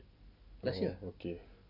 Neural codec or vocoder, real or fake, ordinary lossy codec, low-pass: none; real; none; 5.4 kHz